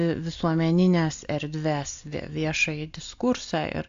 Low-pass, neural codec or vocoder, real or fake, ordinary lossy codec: 7.2 kHz; none; real; AAC, 64 kbps